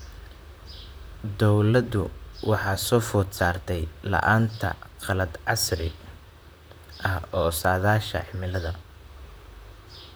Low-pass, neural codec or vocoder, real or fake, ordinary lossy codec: none; vocoder, 44.1 kHz, 128 mel bands, Pupu-Vocoder; fake; none